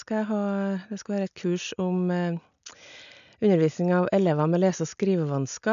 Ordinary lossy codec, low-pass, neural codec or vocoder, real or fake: none; 7.2 kHz; none; real